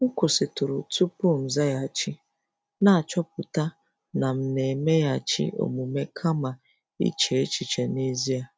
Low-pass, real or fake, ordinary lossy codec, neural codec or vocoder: none; real; none; none